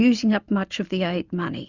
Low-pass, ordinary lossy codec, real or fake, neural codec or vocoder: 7.2 kHz; Opus, 64 kbps; real; none